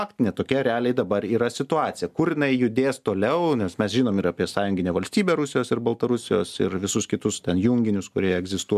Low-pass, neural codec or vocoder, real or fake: 14.4 kHz; none; real